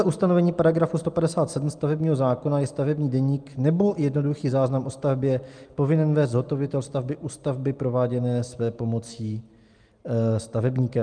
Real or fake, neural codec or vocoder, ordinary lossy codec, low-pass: real; none; Opus, 32 kbps; 9.9 kHz